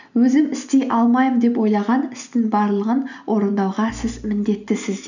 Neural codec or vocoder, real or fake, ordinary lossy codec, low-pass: none; real; none; 7.2 kHz